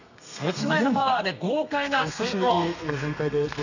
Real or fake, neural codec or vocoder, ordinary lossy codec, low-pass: fake; codec, 32 kHz, 1.9 kbps, SNAC; none; 7.2 kHz